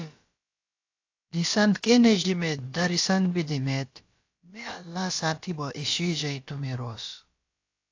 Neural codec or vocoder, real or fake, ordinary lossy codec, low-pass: codec, 16 kHz, about 1 kbps, DyCAST, with the encoder's durations; fake; AAC, 48 kbps; 7.2 kHz